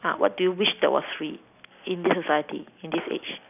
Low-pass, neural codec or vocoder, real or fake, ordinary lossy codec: 3.6 kHz; none; real; none